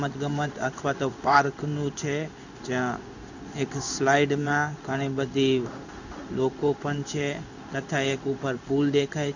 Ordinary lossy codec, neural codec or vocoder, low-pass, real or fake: none; codec, 16 kHz in and 24 kHz out, 1 kbps, XY-Tokenizer; 7.2 kHz; fake